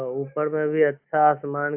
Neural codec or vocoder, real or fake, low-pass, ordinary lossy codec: none; real; 3.6 kHz; AAC, 32 kbps